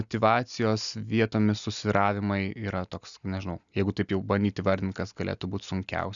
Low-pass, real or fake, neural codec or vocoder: 7.2 kHz; real; none